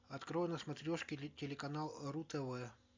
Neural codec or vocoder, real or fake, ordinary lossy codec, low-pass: none; real; MP3, 64 kbps; 7.2 kHz